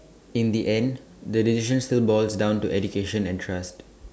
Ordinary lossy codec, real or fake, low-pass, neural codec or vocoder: none; real; none; none